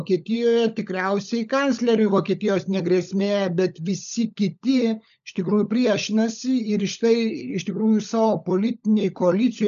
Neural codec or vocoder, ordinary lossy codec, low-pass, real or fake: codec, 16 kHz, 16 kbps, FunCodec, trained on LibriTTS, 50 frames a second; MP3, 96 kbps; 7.2 kHz; fake